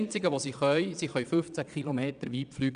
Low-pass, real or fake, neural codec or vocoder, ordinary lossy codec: 9.9 kHz; fake; vocoder, 22.05 kHz, 80 mel bands, Vocos; none